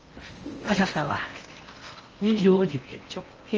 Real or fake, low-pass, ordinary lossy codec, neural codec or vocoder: fake; 7.2 kHz; Opus, 24 kbps; codec, 16 kHz in and 24 kHz out, 0.8 kbps, FocalCodec, streaming, 65536 codes